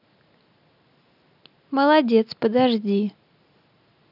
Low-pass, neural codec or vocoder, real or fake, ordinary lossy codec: 5.4 kHz; none; real; none